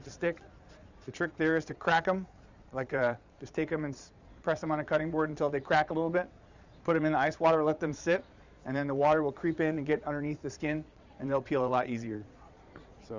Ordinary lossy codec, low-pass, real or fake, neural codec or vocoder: Opus, 64 kbps; 7.2 kHz; fake; vocoder, 22.05 kHz, 80 mel bands, Vocos